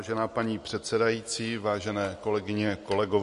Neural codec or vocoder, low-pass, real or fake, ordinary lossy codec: none; 14.4 kHz; real; MP3, 48 kbps